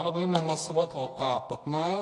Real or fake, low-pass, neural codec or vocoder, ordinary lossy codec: fake; 10.8 kHz; codec, 24 kHz, 0.9 kbps, WavTokenizer, medium music audio release; AAC, 48 kbps